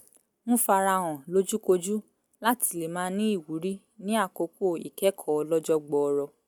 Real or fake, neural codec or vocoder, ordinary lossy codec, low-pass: real; none; none; none